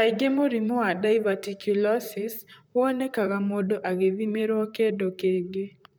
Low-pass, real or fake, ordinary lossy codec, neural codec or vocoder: none; fake; none; vocoder, 44.1 kHz, 128 mel bands, Pupu-Vocoder